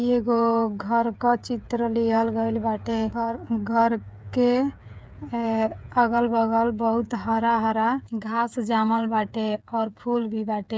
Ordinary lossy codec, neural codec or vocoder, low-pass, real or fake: none; codec, 16 kHz, 8 kbps, FreqCodec, smaller model; none; fake